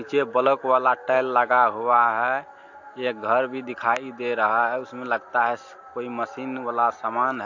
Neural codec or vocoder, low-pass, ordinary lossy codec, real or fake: none; 7.2 kHz; AAC, 48 kbps; real